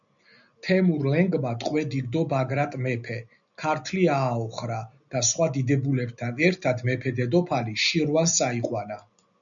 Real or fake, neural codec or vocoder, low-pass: real; none; 7.2 kHz